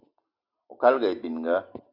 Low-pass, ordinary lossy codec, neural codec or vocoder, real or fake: 5.4 kHz; AAC, 48 kbps; none; real